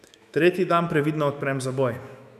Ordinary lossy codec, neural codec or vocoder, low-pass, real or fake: none; autoencoder, 48 kHz, 128 numbers a frame, DAC-VAE, trained on Japanese speech; 14.4 kHz; fake